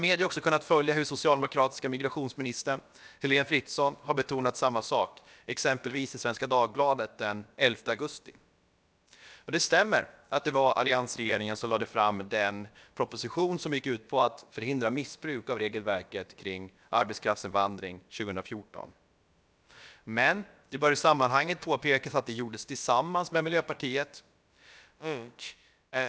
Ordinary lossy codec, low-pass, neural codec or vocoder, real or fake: none; none; codec, 16 kHz, about 1 kbps, DyCAST, with the encoder's durations; fake